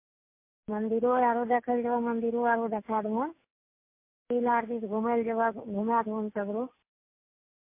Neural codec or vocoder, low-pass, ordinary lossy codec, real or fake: none; 3.6 kHz; MP3, 24 kbps; real